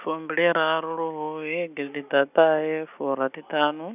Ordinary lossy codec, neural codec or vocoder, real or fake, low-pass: none; none; real; 3.6 kHz